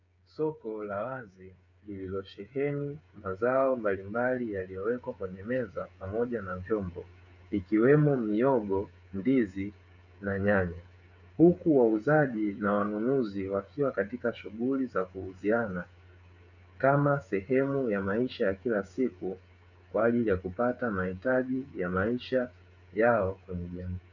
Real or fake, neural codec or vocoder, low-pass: fake; codec, 16 kHz, 8 kbps, FreqCodec, smaller model; 7.2 kHz